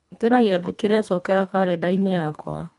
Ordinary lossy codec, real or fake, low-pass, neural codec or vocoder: none; fake; 10.8 kHz; codec, 24 kHz, 1.5 kbps, HILCodec